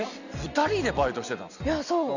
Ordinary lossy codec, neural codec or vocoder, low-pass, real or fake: none; none; 7.2 kHz; real